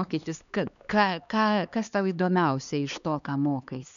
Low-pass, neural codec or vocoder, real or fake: 7.2 kHz; codec, 16 kHz, 2 kbps, X-Codec, HuBERT features, trained on LibriSpeech; fake